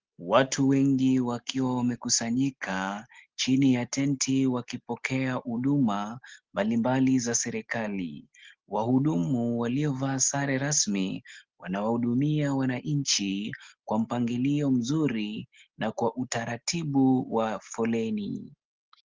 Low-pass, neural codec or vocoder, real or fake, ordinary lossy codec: 7.2 kHz; none; real; Opus, 16 kbps